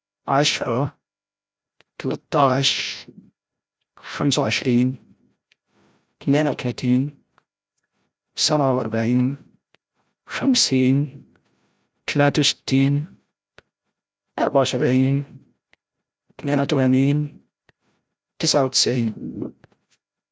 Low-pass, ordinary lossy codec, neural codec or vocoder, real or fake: none; none; codec, 16 kHz, 0.5 kbps, FreqCodec, larger model; fake